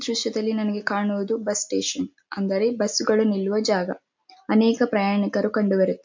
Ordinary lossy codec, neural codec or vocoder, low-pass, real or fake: MP3, 48 kbps; none; 7.2 kHz; real